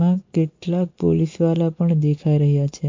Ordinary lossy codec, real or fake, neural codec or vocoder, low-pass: MP3, 48 kbps; real; none; 7.2 kHz